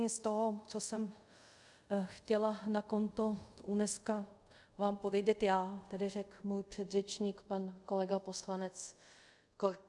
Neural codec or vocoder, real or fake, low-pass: codec, 24 kHz, 0.5 kbps, DualCodec; fake; 10.8 kHz